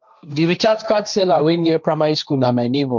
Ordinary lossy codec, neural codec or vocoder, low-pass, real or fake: none; codec, 16 kHz, 1.1 kbps, Voila-Tokenizer; 7.2 kHz; fake